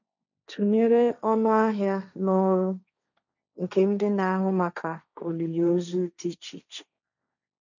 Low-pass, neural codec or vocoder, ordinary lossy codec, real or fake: 7.2 kHz; codec, 16 kHz, 1.1 kbps, Voila-Tokenizer; none; fake